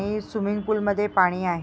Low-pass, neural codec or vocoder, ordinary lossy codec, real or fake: none; none; none; real